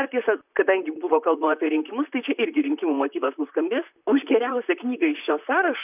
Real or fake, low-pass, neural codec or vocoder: fake; 3.6 kHz; vocoder, 44.1 kHz, 128 mel bands, Pupu-Vocoder